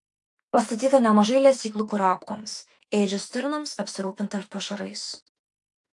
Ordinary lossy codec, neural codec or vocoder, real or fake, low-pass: AAC, 64 kbps; autoencoder, 48 kHz, 32 numbers a frame, DAC-VAE, trained on Japanese speech; fake; 10.8 kHz